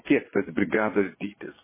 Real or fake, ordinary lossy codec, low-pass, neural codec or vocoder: fake; MP3, 16 kbps; 3.6 kHz; vocoder, 22.05 kHz, 80 mel bands, Vocos